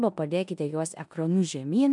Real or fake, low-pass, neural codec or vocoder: fake; 10.8 kHz; codec, 16 kHz in and 24 kHz out, 0.9 kbps, LongCat-Audio-Codec, four codebook decoder